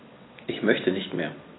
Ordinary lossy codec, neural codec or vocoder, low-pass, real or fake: AAC, 16 kbps; none; 7.2 kHz; real